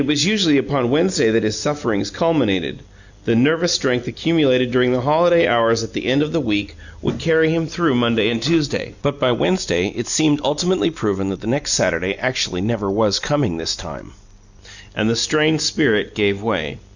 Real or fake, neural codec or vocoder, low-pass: real; none; 7.2 kHz